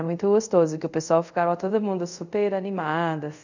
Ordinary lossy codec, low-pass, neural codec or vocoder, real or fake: none; 7.2 kHz; codec, 24 kHz, 0.5 kbps, DualCodec; fake